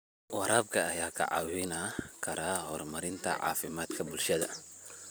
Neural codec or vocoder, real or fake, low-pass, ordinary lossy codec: vocoder, 44.1 kHz, 128 mel bands every 256 samples, BigVGAN v2; fake; none; none